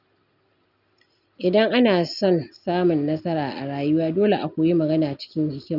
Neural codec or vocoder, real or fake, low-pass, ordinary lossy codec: none; real; 5.4 kHz; none